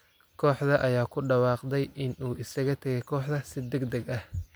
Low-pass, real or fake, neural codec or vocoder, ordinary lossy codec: none; real; none; none